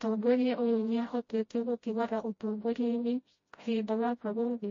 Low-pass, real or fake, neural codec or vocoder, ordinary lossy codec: 7.2 kHz; fake; codec, 16 kHz, 0.5 kbps, FreqCodec, smaller model; MP3, 32 kbps